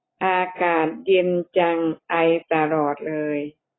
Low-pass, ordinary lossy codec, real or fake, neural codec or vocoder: 7.2 kHz; AAC, 16 kbps; real; none